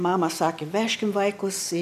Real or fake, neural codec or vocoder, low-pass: real; none; 14.4 kHz